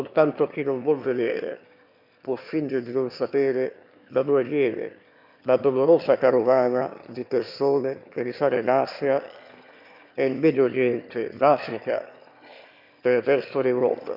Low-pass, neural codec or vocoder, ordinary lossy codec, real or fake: 5.4 kHz; autoencoder, 22.05 kHz, a latent of 192 numbers a frame, VITS, trained on one speaker; none; fake